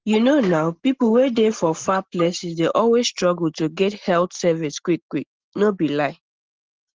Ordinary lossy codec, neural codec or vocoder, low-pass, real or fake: Opus, 16 kbps; none; 7.2 kHz; real